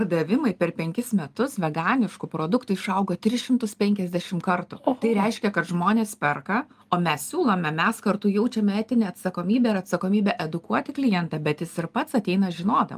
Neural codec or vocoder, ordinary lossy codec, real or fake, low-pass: none; Opus, 32 kbps; real; 14.4 kHz